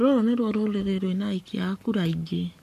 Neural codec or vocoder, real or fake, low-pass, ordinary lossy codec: codec, 44.1 kHz, 7.8 kbps, Pupu-Codec; fake; 14.4 kHz; none